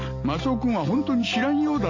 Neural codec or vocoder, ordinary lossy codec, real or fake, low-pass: none; AAC, 48 kbps; real; 7.2 kHz